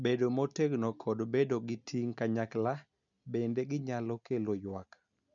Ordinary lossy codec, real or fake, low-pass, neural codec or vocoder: none; real; 7.2 kHz; none